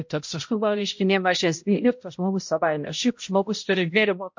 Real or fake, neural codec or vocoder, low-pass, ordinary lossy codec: fake; codec, 16 kHz, 0.5 kbps, X-Codec, HuBERT features, trained on balanced general audio; 7.2 kHz; MP3, 48 kbps